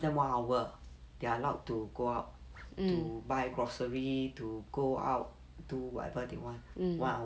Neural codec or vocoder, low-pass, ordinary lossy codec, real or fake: none; none; none; real